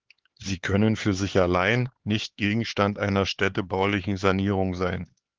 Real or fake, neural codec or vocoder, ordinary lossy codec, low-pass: fake; codec, 16 kHz, 4 kbps, X-Codec, HuBERT features, trained on LibriSpeech; Opus, 16 kbps; 7.2 kHz